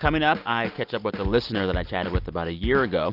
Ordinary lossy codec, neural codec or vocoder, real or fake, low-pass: Opus, 32 kbps; none; real; 5.4 kHz